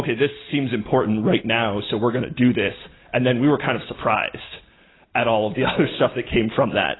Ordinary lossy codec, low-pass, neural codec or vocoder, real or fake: AAC, 16 kbps; 7.2 kHz; none; real